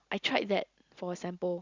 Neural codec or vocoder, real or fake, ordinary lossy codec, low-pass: none; real; Opus, 64 kbps; 7.2 kHz